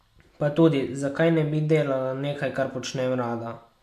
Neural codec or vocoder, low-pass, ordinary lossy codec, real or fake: none; 14.4 kHz; MP3, 96 kbps; real